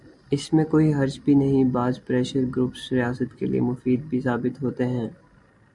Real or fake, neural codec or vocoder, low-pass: real; none; 10.8 kHz